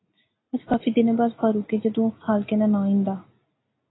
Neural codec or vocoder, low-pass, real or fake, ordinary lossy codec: none; 7.2 kHz; real; AAC, 16 kbps